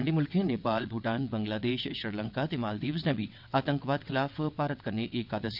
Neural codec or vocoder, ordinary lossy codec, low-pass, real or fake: vocoder, 22.05 kHz, 80 mel bands, WaveNeXt; none; 5.4 kHz; fake